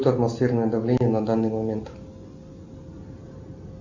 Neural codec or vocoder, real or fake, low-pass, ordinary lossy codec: none; real; 7.2 kHz; Opus, 64 kbps